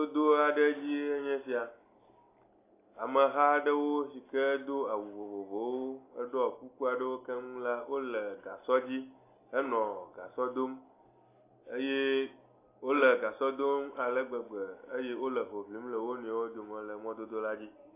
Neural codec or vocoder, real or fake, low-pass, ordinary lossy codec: none; real; 3.6 kHz; AAC, 24 kbps